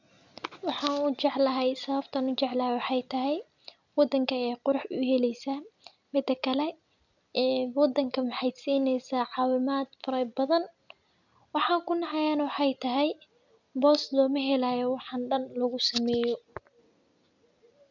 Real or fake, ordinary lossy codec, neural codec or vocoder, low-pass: real; none; none; 7.2 kHz